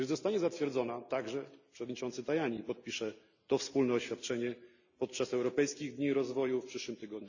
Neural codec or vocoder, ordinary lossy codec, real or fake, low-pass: none; none; real; 7.2 kHz